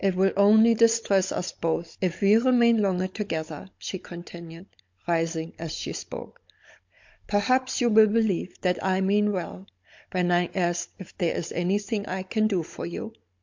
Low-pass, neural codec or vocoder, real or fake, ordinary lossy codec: 7.2 kHz; codec, 16 kHz, 16 kbps, FunCodec, trained on Chinese and English, 50 frames a second; fake; MP3, 48 kbps